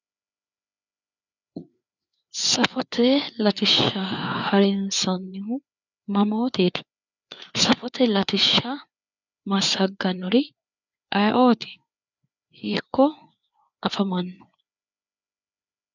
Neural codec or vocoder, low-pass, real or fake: codec, 16 kHz, 4 kbps, FreqCodec, larger model; 7.2 kHz; fake